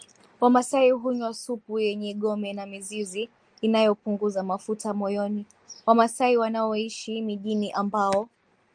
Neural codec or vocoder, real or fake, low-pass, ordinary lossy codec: none; real; 9.9 kHz; Opus, 32 kbps